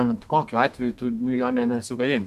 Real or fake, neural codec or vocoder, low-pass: fake; codec, 32 kHz, 1.9 kbps, SNAC; 14.4 kHz